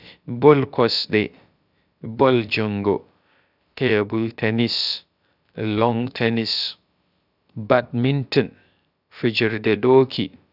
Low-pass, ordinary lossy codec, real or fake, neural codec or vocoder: 5.4 kHz; none; fake; codec, 16 kHz, about 1 kbps, DyCAST, with the encoder's durations